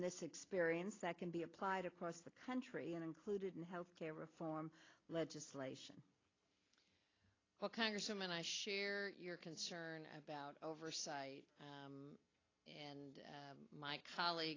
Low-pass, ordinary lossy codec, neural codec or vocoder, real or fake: 7.2 kHz; AAC, 32 kbps; none; real